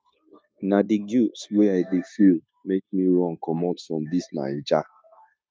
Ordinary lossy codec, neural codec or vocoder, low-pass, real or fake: none; codec, 16 kHz, 0.9 kbps, LongCat-Audio-Codec; none; fake